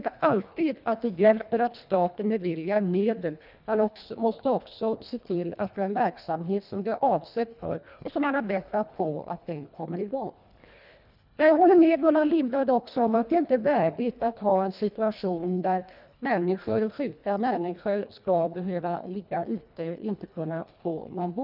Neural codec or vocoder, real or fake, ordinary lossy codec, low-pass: codec, 24 kHz, 1.5 kbps, HILCodec; fake; none; 5.4 kHz